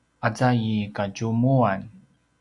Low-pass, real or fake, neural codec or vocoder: 10.8 kHz; real; none